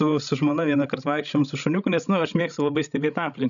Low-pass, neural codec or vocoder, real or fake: 7.2 kHz; codec, 16 kHz, 16 kbps, FreqCodec, larger model; fake